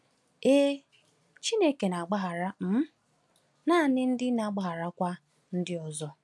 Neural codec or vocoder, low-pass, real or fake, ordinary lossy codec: none; none; real; none